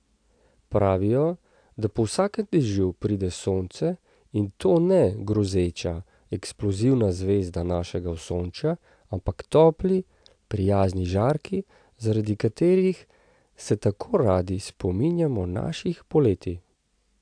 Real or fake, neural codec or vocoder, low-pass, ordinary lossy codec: real; none; 9.9 kHz; AAC, 64 kbps